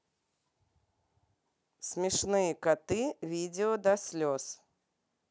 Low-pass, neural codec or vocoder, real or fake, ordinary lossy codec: none; none; real; none